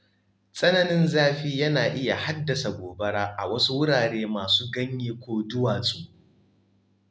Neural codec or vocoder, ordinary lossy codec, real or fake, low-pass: none; none; real; none